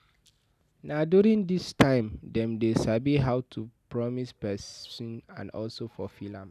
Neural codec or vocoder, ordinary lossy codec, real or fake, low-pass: none; none; real; 14.4 kHz